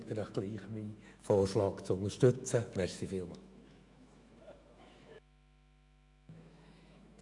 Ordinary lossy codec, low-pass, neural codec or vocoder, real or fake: MP3, 96 kbps; 10.8 kHz; autoencoder, 48 kHz, 128 numbers a frame, DAC-VAE, trained on Japanese speech; fake